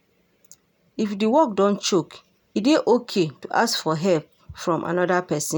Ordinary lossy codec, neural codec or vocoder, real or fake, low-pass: none; none; real; none